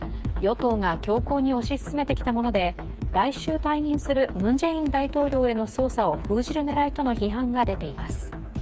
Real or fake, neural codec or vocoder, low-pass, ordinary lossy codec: fake; codec, 16 kHz, 4 kbps, FreqCodec, smaller model; none; none